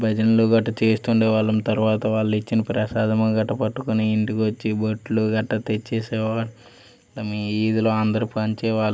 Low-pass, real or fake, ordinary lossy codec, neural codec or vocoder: none; real; none; none